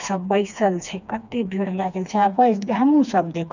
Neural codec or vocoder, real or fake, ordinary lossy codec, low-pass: codec, 16 kHz, 2 kbps, FreqCodec, smaller model; fake; none; 7.2 kHz